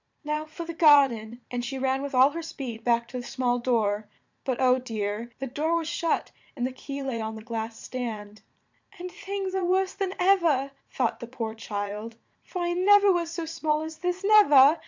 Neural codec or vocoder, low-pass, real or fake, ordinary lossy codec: vocoder, 44.1 kHz, 80 mel bands, Vocos; 7.2 kHz; fake; MP3, 64 kbps